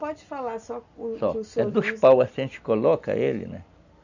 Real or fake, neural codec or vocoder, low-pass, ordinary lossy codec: real; none; 7.2 kHz; none